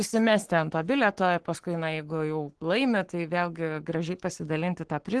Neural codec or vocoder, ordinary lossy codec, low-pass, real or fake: codec, 44.1 kHz, 7.8 kbps, Pupu-Codec; Opus, 16 kbps; 10.8 kHz; fake